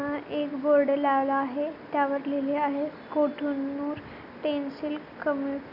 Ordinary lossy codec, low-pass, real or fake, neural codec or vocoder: none; 5.4 kHz; real; none